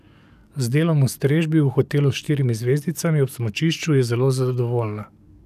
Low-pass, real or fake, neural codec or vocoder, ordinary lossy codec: 14.4 kHz; fake; codec, 44.1 kHz, 7.8 kbps, DAC; none